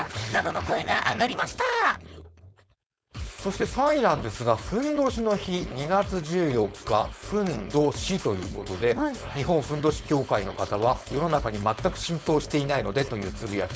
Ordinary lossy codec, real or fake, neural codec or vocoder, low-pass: none; fake; codec, 16 kHz, 4.8 kbps, FACodec; none